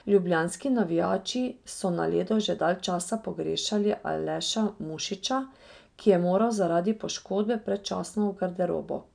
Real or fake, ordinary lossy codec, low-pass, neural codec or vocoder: real; none; 9.9 kHz; none